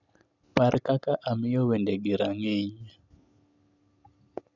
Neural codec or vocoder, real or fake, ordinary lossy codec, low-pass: none; real; none; 7.2 kHz